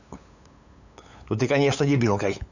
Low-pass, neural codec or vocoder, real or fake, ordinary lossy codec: 7.2 kHz; codec, 16 kHz, 8 kbps, FunCodec, trained on LibriTTS, 25 frames a second; fake; none